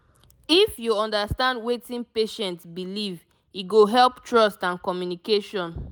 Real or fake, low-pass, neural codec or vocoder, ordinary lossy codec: real; none; none; none